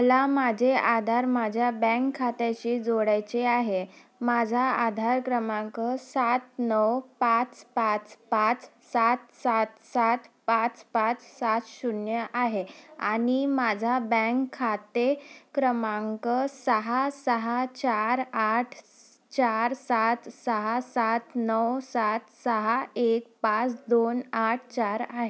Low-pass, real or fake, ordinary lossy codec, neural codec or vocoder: none; real; none; none